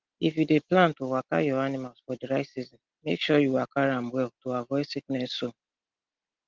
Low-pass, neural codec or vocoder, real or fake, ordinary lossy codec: 7.2 kHz; none; real; Opus, 16 kbps